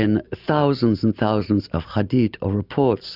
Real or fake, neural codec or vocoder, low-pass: real; none; 5.4 kHz